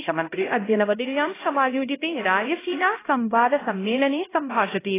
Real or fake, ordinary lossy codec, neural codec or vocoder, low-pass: fake; AAC, 16 kbps; codec, 16 kHz, 0.5 kbps, X-Codec, HuBERT features, trained on LibriSpeech; 3.6 kHz